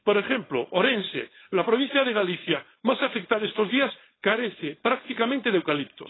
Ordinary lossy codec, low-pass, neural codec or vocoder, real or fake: AAC, 16 kbps; 7.2 kHz; codec, 16 kHz, 4.8 kbps, FACodec; fake